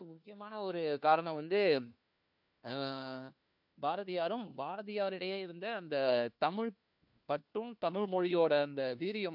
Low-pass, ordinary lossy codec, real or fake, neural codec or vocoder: 5.4 kHz; none; fake; codec, 16 kHz, 1 kbps, FunCodec, trained on LibriTTS, 50 frames a second